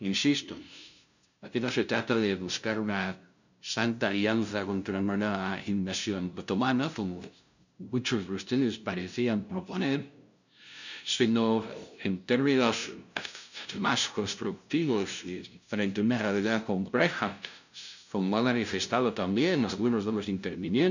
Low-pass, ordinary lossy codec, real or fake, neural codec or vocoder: 7.2 kHz; none; fake; codec, 16 kHz, 0.5 kbps, FunCodec, trained on LibriTTS, 25 frames a second